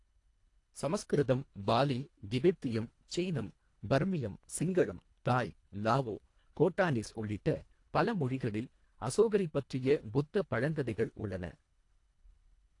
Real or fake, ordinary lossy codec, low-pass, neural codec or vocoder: fake; AAC, 48 kbps; 10.8 kHz; codec, 24 kHz, 1.5 kbps, HILCodec